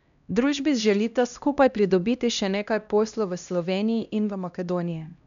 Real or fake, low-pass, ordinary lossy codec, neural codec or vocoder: fake; 7.2 kHz; none; codec, 16 kHz, 1 kbps, X-Codec, HuBERT features, trained on LibriSpeech